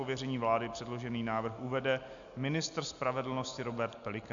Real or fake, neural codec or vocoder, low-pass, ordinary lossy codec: real; none; 7.2 kHz; AAC, 64 kbps